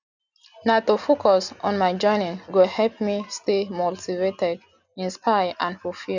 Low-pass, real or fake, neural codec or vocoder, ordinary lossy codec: 7.2 kHz; real; none; none